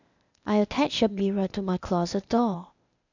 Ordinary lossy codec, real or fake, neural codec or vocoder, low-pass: none; fake; codec, 16 kHz, 0.8 kbps, ZipCodec; 7.2 kHz